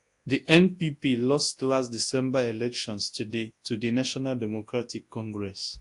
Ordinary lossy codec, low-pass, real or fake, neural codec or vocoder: AAC, 48 kbps; 10.8 kHz; fake; codec, 24 kHz, 0.9 kbps, WavTokenizer, large speech release